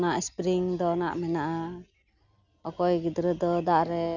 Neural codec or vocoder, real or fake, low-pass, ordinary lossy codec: none; real; 7.2 kHz; none